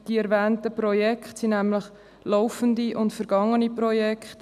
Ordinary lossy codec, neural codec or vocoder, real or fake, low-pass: none; none; real; 14.4 kHz